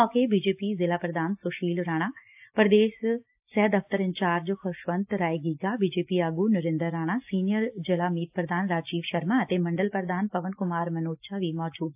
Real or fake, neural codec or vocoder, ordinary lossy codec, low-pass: real; none; AAC, 32 kbps; 3.6 kHz